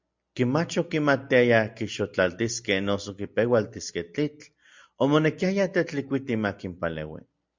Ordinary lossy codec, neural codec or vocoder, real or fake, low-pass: MP3, 64 kbps; none; real; 7.2 kHz